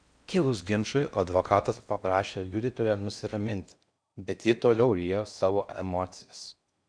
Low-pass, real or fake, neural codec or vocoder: 9.9 kHz; fake; codec, 16 kHz in and 24 kHz out, 0.6 kbps, FocalCodec, streaming, 4096 codes